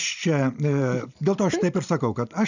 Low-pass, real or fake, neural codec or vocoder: 7.2 kHz; fake; vocoder, 44.1 kHz, 128 mel bands every 512 samples, BigVGAN v2